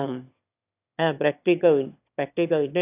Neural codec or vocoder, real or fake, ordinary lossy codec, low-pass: autoencoder, 22.05 kHz, a latent of 192 numbers a frame, VITS, trained on one speaker; fake; AAC, 32 kbps; 3.6 kHz